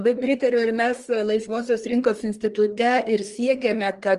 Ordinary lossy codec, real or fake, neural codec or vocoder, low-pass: Opus, 24 kbps; fake; codec, 24 kHz, 1 kbps, SNAC; 10.8 kHz